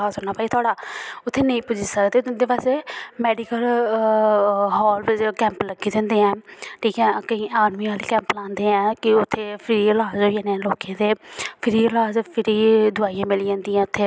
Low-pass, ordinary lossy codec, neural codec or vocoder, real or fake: none; none; none; real